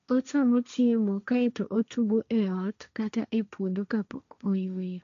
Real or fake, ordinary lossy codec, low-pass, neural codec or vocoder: fake; MP3, 64 kbps; 7.2 kHz; codec, 16 kHz, 1.1 kbps, Voila-Tokenizer